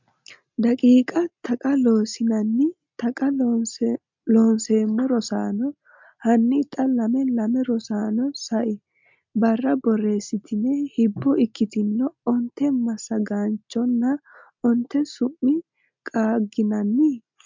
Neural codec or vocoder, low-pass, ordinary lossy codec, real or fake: none; 7.2 kHz; MP3, 64 kbps; real